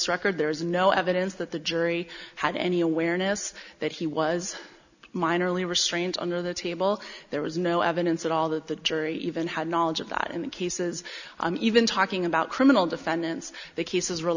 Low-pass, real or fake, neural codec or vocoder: 7.2 kHz; real; none